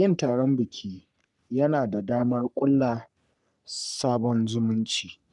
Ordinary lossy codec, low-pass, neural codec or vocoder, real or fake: none; 10.8 kHz; codec, 44.1 kHz, 3.4 kbps, Pupu-Codec; fake